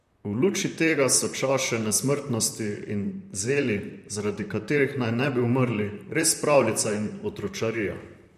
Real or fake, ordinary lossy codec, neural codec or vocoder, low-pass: fake; MP3, 64 kbps; vocoder, 44.1 kHz, 128 mel bands, Pupu-Vocoder; 14.4 kHz